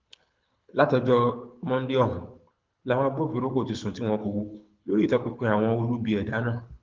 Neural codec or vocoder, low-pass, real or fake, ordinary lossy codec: codec, 24 kHz, 6 kbps, HILCodec; 7.2 kHz; fake; Opus, 32 kbps